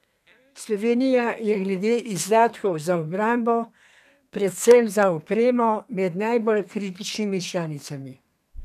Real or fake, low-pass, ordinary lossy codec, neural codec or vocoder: fake; 14.4 kHz; none; codec, 32 kHz, 1.9 kbps, SNAC